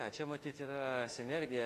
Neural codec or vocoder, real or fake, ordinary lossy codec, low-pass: autoencoder, 48 kHz, 32 numbers a frame, DAC-VAE, trained on Japanese speech; fake; AAC, 48 kbps; 14.4 kHz